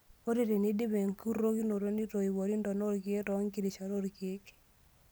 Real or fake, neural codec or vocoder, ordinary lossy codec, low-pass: real; none; none; none